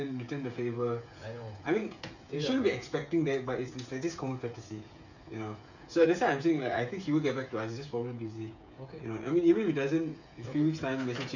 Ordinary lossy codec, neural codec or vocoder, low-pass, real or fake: none; codec, 16 kHz, 8 kbps, FreqCodec, smaller model; 7.2 kHz; fake